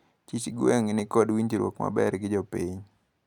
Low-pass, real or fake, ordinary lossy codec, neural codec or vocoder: 19.8 kHz; real; none; none